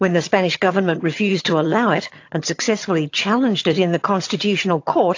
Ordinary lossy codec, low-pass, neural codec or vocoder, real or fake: AAC, 48 kbps; 7.2 kHz; vocoder, 22.05 kHz, 80 mel bands, HiFi-GAN; fake